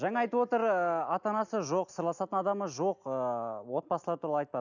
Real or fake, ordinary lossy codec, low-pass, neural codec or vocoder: real; none; 7.2 kHz; none